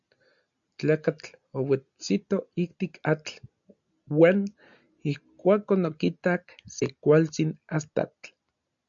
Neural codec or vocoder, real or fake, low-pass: none; real; 7.2 kHz